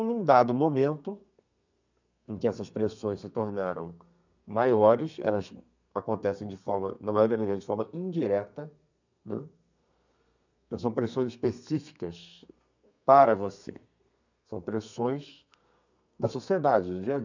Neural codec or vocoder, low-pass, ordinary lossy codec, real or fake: codec, 32 kHz, 1.9 kbps, SNAC; 7.2 kHz; none; fake